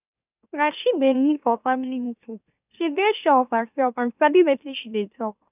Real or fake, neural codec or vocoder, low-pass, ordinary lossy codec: fake; autoencoder, 44.1 kHz, a latent of 192 numbers a frame, MeloTTS; 3.6 kHz; none